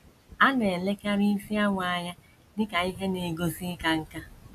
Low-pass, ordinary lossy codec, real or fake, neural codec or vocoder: 14.4 kHz; none; real; none